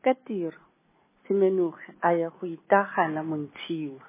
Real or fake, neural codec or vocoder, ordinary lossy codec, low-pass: real; none; MP3, 16 kbps; 3.6 kHz